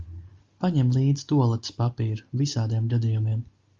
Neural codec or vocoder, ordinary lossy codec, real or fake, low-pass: none; Opus, 32 kbps; real; 7.2 kHz